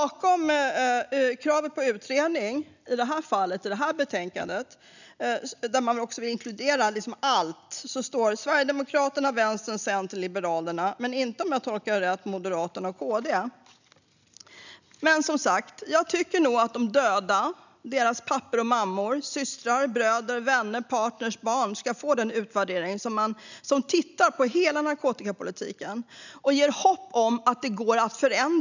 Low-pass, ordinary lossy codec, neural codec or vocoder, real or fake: 7.2 kHz; none; none; real